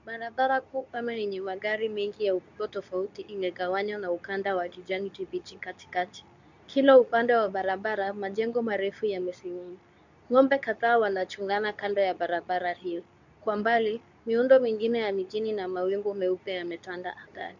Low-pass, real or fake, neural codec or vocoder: 7.2 kHz; fake; codec, 24 kHz, 0.9 kbps, WavTokenizer, medium speech release version 2